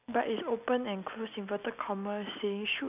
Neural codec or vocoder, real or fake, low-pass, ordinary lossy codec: none; real; 3.6 kHz; none